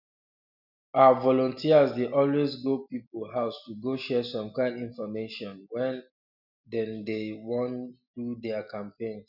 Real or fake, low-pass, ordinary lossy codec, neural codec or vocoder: real; 5.4 kHz; none; none